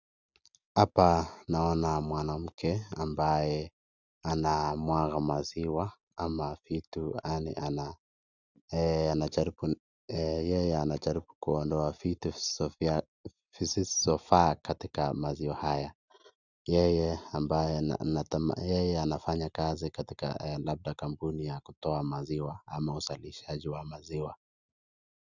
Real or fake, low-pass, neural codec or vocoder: real; 7.2 kHz; none